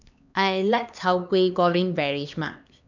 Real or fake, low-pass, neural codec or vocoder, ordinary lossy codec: fake; 7.2 kHz; codec, 16 kHz, 2 kbps, X-Codec, HuBERT features, trained on LibriSpeech; none